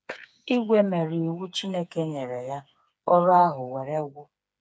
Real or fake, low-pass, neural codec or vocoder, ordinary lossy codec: fake; none; codec, 16 kHz, 4 kbps, FreqCodec, smaller model; none